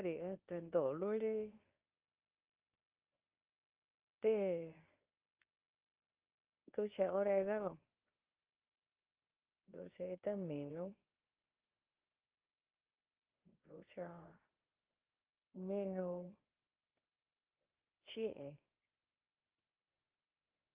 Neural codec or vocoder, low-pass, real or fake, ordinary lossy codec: codec, 24 kHz, 0.9 kbps, WavTokenizer, medium speech release version 2; 3.6 kHz; fake; Opus, 32 kbps